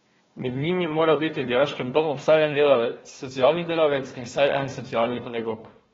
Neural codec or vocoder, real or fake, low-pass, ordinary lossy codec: codec, 16 kHz, 1 kbps, FunCodec, trained on Chinese and English, 50 frames a second; fake; 7.2 kHz; AAC, 24 kbps